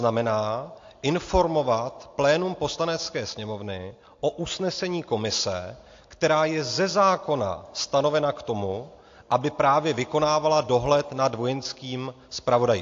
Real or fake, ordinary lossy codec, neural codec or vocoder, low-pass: real; AAC, 48 kbps; none; 7.2 kHz